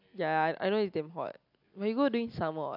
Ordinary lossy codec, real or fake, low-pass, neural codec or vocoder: AAC, 48 kbps; real; 5.4 kHz; none